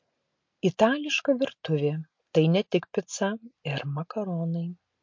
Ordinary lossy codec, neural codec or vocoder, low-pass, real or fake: MP3, 48 kbps; none; 7.2 kHz; real